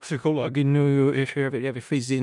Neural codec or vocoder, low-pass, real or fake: codec, 16 kHz in and 24 kHz out, 0.4 kbps, LongCat-Audio-Codec, four codebook decoder; 10.8 kHz; fake